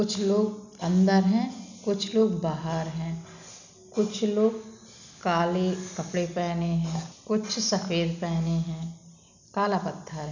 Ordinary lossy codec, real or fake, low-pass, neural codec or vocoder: none; real; 7.2 kHz; none